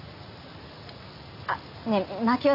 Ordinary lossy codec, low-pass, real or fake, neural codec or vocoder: none; 5.4 kHz; real; none